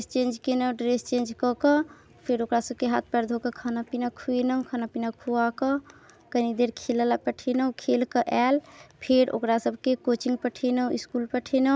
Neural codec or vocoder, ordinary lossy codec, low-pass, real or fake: none; none; none; real